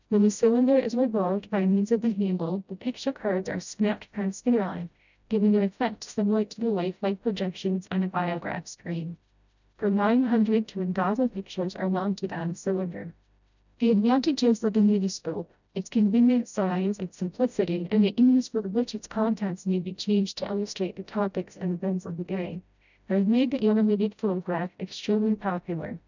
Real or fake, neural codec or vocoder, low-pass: fake; codec, 16 kHz, 0.5 kbps, FreqCodec, smaller model; 7.2 kHz